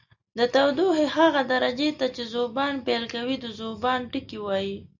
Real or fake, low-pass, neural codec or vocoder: real; 7.2 kHz; none